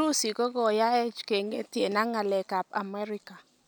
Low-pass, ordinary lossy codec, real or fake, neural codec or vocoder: none; none; real; none